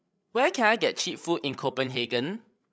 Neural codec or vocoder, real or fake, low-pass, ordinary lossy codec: codec, 16 kHz, 8 kbps, FreqCodec, larger model; fake; none; none